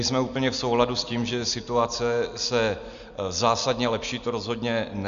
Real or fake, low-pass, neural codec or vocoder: real; 7.2 kHz; none